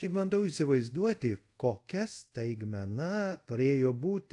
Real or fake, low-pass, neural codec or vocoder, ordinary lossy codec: fake; 10.8 kHz; codec, 24 kHz, 0.5 kbps, DualCodec; AAC, 48 kbps